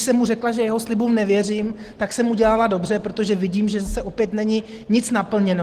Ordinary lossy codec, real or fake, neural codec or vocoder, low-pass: Opus, 16 kbps; real; none; 14.4 kHz